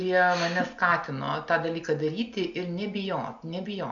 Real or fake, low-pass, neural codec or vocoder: real; 7.2 kHz; none